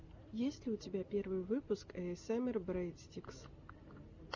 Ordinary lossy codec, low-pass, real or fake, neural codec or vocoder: Opus, 64 kbps; 7.2 kHz; real; none